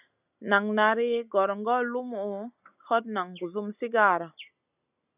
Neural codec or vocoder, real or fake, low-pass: none; real; 3.6 kHz